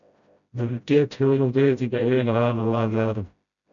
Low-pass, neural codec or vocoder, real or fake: 7.2 kHz; codec, 16 kHz, 0.5 kbps, FreqCodec, smaller model; fake